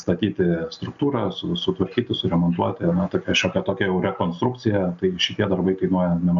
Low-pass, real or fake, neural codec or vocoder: 7.2 kHz; real; none